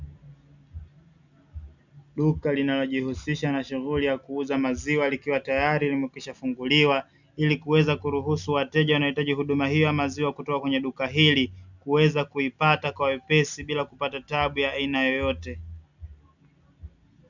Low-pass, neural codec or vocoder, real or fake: 7.2 kHz; none; real